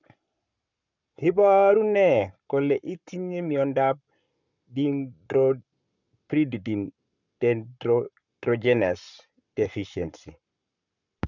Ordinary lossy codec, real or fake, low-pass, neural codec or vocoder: none; fake; 7.2 kHz; codec, 44.1 kHz, 7.8 kbps, Pupu-Codec